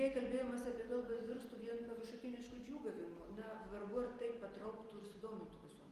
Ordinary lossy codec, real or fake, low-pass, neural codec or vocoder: Opus, 24 kbps; real; 14.4 kHz; none